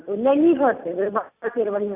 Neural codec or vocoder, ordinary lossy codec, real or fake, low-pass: none; Opus, 64 kbps; real; 3.6 kHz